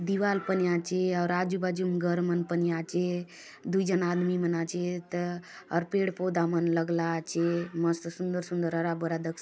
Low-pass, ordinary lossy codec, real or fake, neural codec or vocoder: none; none; real; none